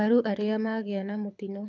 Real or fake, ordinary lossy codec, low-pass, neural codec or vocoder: fake; MP3, 64 kbps; 7.2 kHz; codec, 24 kHz, 6 kbps, HILCodec